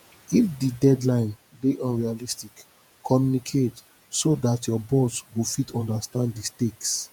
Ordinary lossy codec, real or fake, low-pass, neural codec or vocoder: none; fake; none; vocoder, 48 kHz, 128 mel bands, Vocos